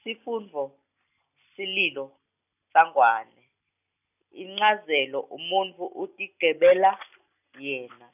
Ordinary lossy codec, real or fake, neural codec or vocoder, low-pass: none; real; none; 3.6 kHz